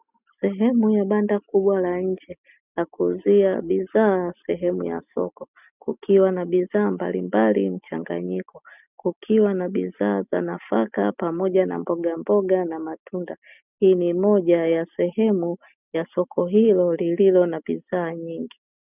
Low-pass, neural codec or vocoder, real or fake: 3.6 kHz; none; real